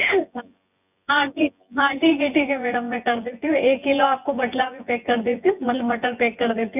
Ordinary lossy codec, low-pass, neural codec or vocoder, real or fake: none; 3.6 kHz; vocoder, 24 kHz, 100 mel bands, Vocos; fake